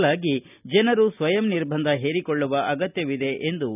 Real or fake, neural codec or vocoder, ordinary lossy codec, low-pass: real; none; none; 3.6 kHz